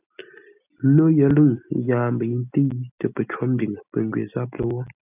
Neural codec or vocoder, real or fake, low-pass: none; real; 3.6 kHz